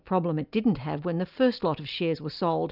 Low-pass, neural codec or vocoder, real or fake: 5.4 kHz; none; real